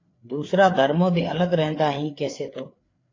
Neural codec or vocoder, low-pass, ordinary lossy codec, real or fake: codec, 16 kHz, 4 kbps, FreqCodec, larger model; 7.2 kHz; AAC, 32 kbps; fake